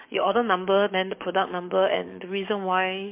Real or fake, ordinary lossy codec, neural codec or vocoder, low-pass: fake; MP3, 32 kbps; codec, 44.1 kHz, 7.8 kbps, DAC; 3.6 kHz